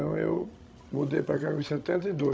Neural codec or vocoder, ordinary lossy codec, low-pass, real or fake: codec, 16 kHz, 16 kbps, FreqCodec, larger model; none; none; fake